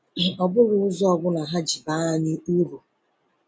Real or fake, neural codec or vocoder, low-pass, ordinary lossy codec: real; none; none; none